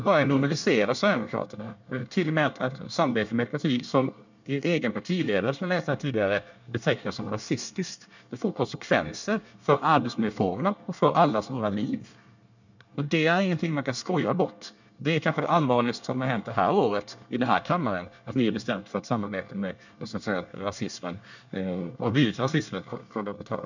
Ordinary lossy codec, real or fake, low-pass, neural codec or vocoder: none; fake; 7.2 kHz; codec, 24 kHz, 1 kbps, SNAC